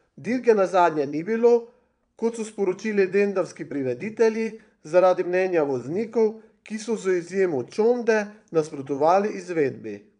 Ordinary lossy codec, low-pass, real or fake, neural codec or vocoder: none; 9.9 kHz; fake; vocoder, 22.05 kHz, 80 mel bands, Vocos